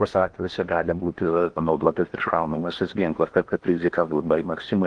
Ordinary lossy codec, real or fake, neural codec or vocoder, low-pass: Opus, 24 kbps; fake; codec, 16 kHz in and 24 kHz out, 0.8 kbps, FocalCodec, streaming, 65536 codes; 9.9 kHz